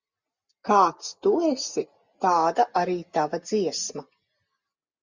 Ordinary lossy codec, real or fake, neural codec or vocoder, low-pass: Opus, 64 kbps; real; none; 7.2 kHz